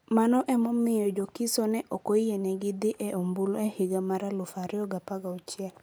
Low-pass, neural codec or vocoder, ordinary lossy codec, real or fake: none; none; none; real